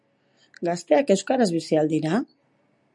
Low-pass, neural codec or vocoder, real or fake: 10.8 kHz; none; real